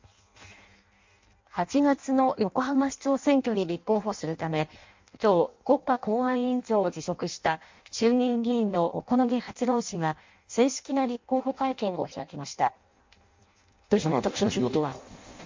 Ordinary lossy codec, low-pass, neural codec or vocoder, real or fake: MP3, 48 kbps; 7.2 kHz; codec, 16 kHz in and 24 kHz out, 0.6 kbps, FireRedTTS-2 codec; fake